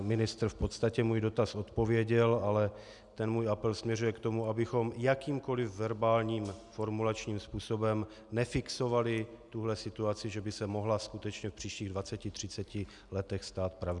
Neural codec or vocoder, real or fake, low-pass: none; real; 10.8 kHz